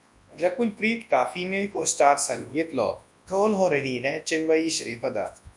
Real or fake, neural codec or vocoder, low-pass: fake; codec, 24 kHz, 0.9 kbps, WavTokenizer, large speech release; 10.8 kHz